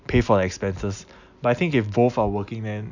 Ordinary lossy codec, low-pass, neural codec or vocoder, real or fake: none; 7.2 kHz; none; real